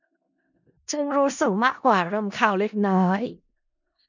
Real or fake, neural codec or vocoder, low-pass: fake; codec, 16 kHz in and 24 kHz out, 0.4 kbps, LongCat-Audio-Codec, four codebook decoder; 7.2 kHz